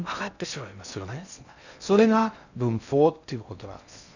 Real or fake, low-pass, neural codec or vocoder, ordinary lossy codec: fake; 7.2 kHz; codec, 16 kHz in and 24 kHz out, 0.6 kbps, FocalCodec, streaming, 2048 codes; none